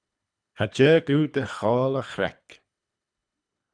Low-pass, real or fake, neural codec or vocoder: 9.9 kHz; fake; codec, 24 kHz, 3 kbps, HILCodec